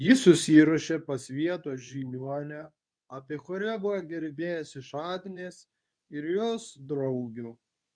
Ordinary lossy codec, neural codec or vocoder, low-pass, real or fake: MP3, 96 kbps; codec, 24 kHz, 0.9 kbps, WavTokenizer, medium speech release version 2; 9.9 kHz; fake